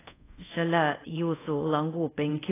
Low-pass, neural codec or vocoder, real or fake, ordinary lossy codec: 3.6 kHz; codec, 24 kHz, 0.5 kbps, DualCodec; fake; AAC, 16 kbps